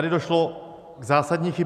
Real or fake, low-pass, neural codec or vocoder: real; 14.4 kHz; none